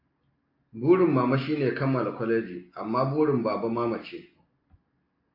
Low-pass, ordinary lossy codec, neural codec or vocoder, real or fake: 5.4 kHz; AAC, 32 kbps; none; real